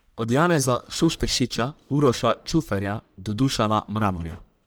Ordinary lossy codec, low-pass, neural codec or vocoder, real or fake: none; none; codec, 44.1 kHz, 1.7 kbps, Pupu-Codec; fake